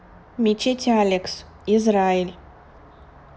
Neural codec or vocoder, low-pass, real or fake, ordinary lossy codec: none; none; real; none